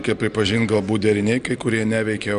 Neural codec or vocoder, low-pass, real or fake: none; 9.9 kHz; real